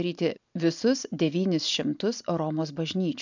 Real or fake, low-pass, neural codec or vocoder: real; 7.2 kHz; none